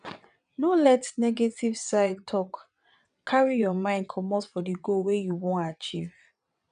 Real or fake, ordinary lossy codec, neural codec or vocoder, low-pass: fake; none; vocoder, 22.05 kHz, 80 mel bands, WaveNeXt; 9.9 kHz